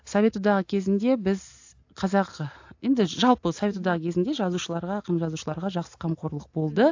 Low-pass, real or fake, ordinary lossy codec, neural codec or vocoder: 7.2 kHz; real; AAC, 48 kbps; none